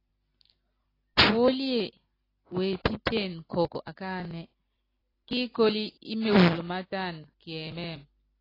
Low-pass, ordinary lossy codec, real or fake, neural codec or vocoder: 5.4 kHz; AAC, 24 kbps; real; none